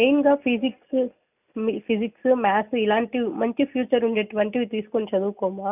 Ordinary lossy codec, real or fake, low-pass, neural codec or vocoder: none; real; 3.6 kHz; none